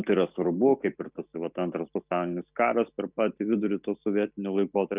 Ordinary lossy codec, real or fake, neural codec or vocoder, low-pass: Opus, 64 kbps; real; none; 3.6 kHz